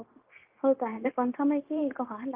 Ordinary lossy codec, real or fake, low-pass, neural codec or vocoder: Opus, 32 kbps; fake; 3.6 kHz; codec, 16 kHz, 0.9 kbps, LongCat-Audio-Codec